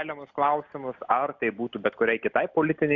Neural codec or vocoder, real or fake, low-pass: none; real; 7.2 kHz